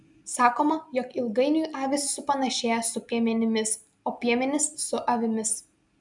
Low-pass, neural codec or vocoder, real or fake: 10.8 kHz; none; real